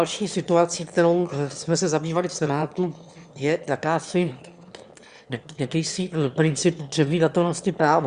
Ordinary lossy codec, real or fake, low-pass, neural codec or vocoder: Opus, 64 kbps; fake; 9.9 kHz; autoencoder, 22.05 kHz, a latent of 192 numbers a frame, VITS, trained on one speaker